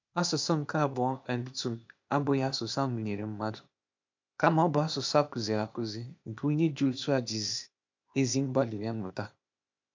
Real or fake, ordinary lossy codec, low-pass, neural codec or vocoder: fake; MP3, 64 kbps; 7.2 kHz; codec, 16 kHz, 0.8 kbps, ZipCodec